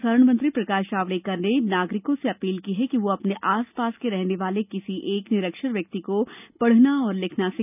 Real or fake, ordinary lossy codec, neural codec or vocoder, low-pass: real; none; none; 3.6 kHz